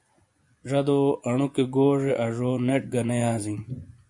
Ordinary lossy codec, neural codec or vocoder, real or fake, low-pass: MP3, 64 kbps; vocoder, 24 kHz, 100 mel bands, Vocos; fake; 10.8 kHz